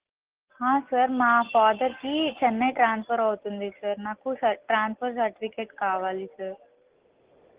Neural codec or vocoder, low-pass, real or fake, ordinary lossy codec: none; 3.6 kHz; real; Opus, 16 kbps